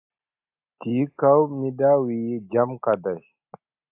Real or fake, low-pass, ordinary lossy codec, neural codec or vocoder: real; 3.6 kHz; AAC, 32 kbps; none